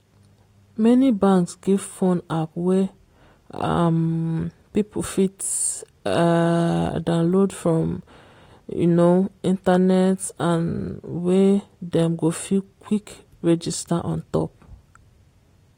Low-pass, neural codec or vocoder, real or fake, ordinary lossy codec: 19.8 kHz; none; real; AAC, 48 kbps